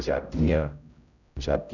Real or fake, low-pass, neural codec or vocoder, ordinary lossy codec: fake; 7.2 kHz; codec, 16 kHz, 0.5 kbps, X-Codec, HuBERT features, trained on general audio; none